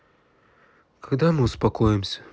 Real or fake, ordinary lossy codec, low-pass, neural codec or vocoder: real; none; none; none